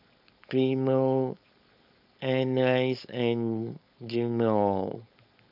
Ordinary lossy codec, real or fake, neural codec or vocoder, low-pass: none; fake; codec, 16 kHz, 4.8 kbps, FACodec; 5.4 kHz